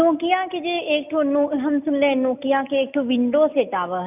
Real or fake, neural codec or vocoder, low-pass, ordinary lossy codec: real; none; 3.6 kHz; none